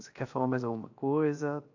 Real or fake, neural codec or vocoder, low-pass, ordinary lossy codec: fake; codec, 16 kHz, 0.7 kbps, FocalCodec; 7.2 kHz; AAC, 48 kbps